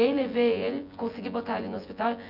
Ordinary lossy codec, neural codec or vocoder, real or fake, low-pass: none; vocoder, 24 kHz, 100 mel bands, Vocos; fake; 5.4 kHz